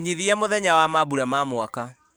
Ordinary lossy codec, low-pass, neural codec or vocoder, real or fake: none; none; codec, 44.1 kHz, 7.8 kbps, DAC; fake